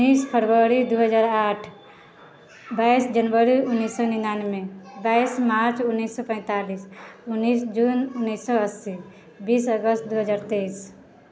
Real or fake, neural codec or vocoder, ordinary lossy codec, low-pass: real; none; none; none